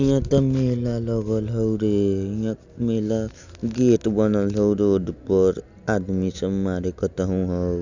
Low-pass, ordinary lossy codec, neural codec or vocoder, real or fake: 7.2 kHz; none; none; real